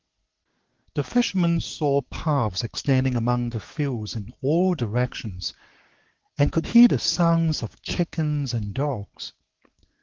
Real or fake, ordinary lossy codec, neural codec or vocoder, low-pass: fake; Opus, 16 kbps; codec, 16 kHz, 6 kbps, DAC; 7.2 kHz